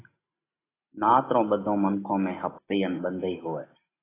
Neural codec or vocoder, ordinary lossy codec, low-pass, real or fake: none; AAC, 16 kbps; 3.6 kHz; real